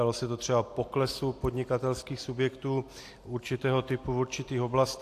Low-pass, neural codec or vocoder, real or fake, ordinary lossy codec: 14.4 kHz; none; real; AAC, 64 kbps